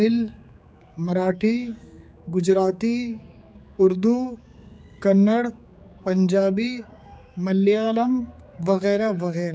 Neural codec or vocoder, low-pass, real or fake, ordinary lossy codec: codec, 16 kHz, 4 kbps, X-Codec, HuBERT features, trained on general audio; none; fake; none